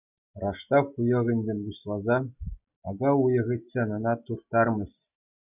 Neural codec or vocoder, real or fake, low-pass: none; real; 3.6 kHz